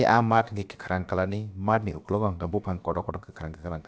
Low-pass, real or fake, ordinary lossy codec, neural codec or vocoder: none; fake; none; codec, 16 kHz, about 1 kbps, DyCAST, with the encoder's durations